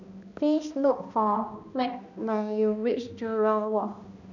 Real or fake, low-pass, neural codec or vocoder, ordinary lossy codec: fake; 7.2 kHz; codec, 16 kHz, 1 kbps, X-Codec, HuBERT features, trained on balanced general audio; none